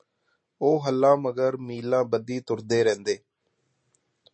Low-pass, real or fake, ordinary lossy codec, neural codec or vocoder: 9.9 kHz; real; MP3, 32 kbps; none